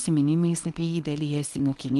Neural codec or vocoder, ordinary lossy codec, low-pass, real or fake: codec, 24 kHz, 0.9 kbps, WavTokenizer, small release; Opus, 24 kbps; 10.8 kHz; fake